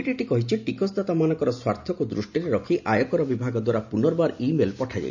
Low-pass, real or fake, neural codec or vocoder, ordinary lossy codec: 7.2 kHz; real; none; none